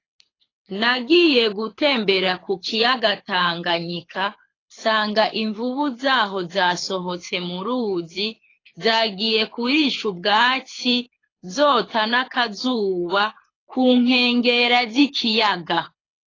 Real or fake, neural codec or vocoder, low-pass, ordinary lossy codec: fake; codec, 24 kHz, 6 kbps, HILCodec; 7.2 kHz; AAC, 32 kbps